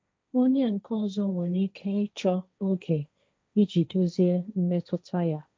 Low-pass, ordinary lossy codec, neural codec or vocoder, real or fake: none; none; codec, 16 kHz, 1.1 kbps, Voila-Tokenizer; fake